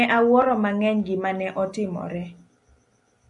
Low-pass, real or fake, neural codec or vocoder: 10.8 kHz; real; none